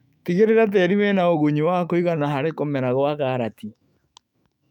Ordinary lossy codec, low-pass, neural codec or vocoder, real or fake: none; 19.8 kHz; autoencoder, 48 kHz, 128 numbers a frame, DAC-VAE, trained on Japanese speech; fake